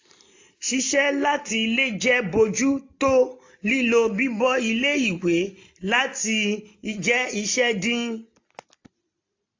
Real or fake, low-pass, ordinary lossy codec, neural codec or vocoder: real; 7.2 kHz; AAC, 48 kbps; none